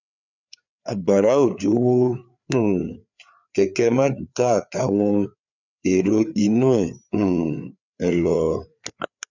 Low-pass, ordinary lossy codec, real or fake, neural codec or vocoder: 7.2 kHz; none; fake; codec, 16 kHz, 4 kbps, FreqCodec, larger model